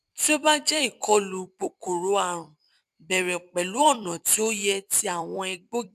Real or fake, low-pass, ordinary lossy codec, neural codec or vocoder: fake; 14.4 kHz; none; vocoder, 44.1 kHz, 128 mel bands every 256 samples, BigVGAN v2